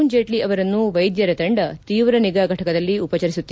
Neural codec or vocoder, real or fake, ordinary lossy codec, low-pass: none; real; none; none